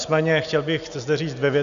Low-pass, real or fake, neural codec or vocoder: 7.2 kHz; real; none